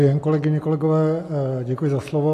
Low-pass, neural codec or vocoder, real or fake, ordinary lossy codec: 14.4 kHz; none; real; AAC, 48 kbps